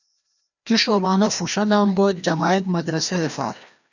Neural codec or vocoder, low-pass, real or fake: codec, 16 kHz, 1 kbps, FreqCodec, larger model; 7.2 kHz; fake